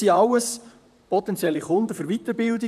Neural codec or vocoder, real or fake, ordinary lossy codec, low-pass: vocoder, 44.1 kHz, 128 mel bands, Pupu-Vocoder; fake; none; 14.4 kHz